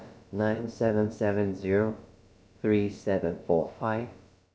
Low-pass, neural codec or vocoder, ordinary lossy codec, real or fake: none; codec, 16 kHz, about 1 kbps, DyCAST, with the encoder's durations; none; fake